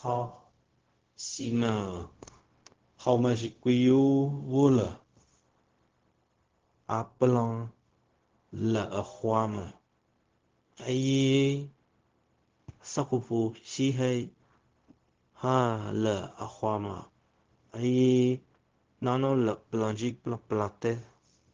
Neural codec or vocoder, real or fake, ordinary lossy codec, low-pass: codec, 16 kHz, 0.4 kbps, LongCat-Audio-Codec; fake; Opus, 16 kbps; 7.2 kHz